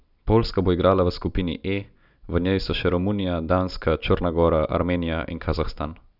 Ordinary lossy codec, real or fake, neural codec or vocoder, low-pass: none; real; none; 5.4 kHz